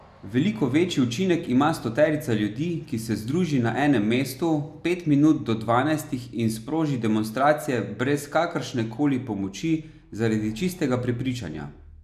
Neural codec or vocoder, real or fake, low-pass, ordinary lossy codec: none; real; 14.4 kHz; none